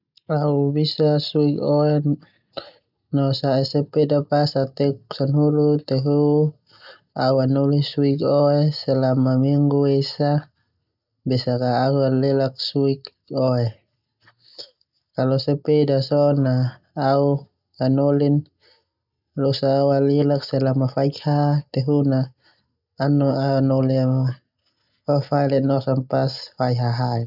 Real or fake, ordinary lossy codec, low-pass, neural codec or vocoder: real; none; 5.4 kHz; none